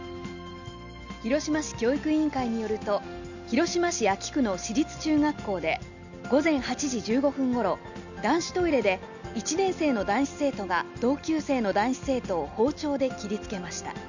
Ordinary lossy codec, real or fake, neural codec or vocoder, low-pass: none; real; none; 7.2 kHz